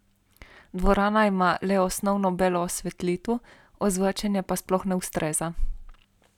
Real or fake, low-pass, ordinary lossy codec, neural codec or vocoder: real; 19.8 kHz; none; none